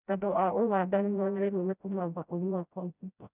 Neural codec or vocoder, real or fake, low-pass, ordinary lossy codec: codec, 16 kHz, 0.5 kbps, FreqCodec, smaller model; fake; 3.6 kHz; none